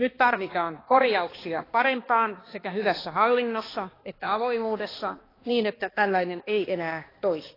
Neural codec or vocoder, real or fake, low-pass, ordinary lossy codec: codec, 16 kHz, 2 kbps, X-Codec, HuBERT features, trained on balanced general audio; fake; 5.4 kHz; AAC, 24 kbps